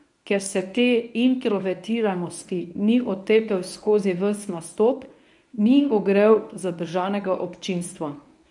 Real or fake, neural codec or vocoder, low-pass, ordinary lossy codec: fake; codec, 24 kHz, 0.9 kbps, WavTokenizer, medium speech release version 1; 10.8 kHz; none